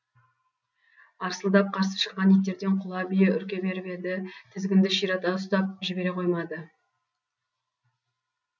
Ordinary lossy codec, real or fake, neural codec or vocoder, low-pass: none; real; none; 7.2 kHz